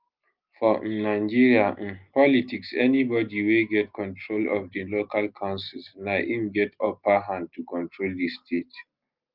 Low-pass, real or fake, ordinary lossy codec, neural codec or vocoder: 5.4 kHz; real; Opus, 24 kbps; none